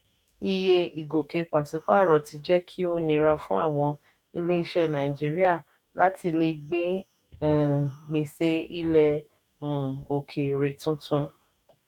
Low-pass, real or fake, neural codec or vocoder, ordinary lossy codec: 19.8 kHz; fake; codec, 44.1 kHz, 2.6 kbps, DAC; none